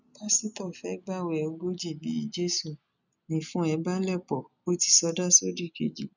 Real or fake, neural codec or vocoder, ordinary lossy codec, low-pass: real; none; none; 7.2 kHz